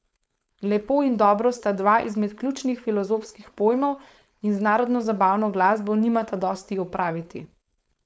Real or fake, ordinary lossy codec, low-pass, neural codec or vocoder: fake; none; none; codec, 16 kHz, 4.8 kbps, FACodec